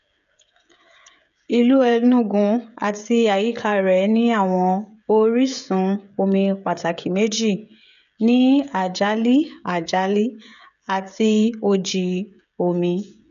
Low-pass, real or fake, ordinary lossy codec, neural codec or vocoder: 7.2 kHz; fake; none; codec, 16 kHz, 16 kbps, FreqCodec, smaller model